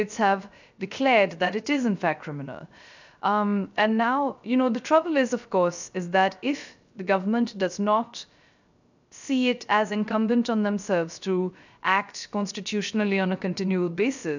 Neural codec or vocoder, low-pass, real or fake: codec, 16 kHz, 0.3 kbps, FocalCodec; 7.2 kHz; fake